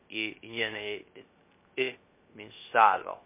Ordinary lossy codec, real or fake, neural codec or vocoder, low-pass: MP3, 24 kbps; fake; codec, 16 kHz, 0.3 kbps, FocalCodec; 3.6 kHz